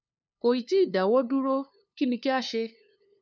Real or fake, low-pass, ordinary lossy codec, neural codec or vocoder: fake; none; none; codec, 16 kHz, 2 kbps, FunCodec, trained on LibriTTS, 25 frames a second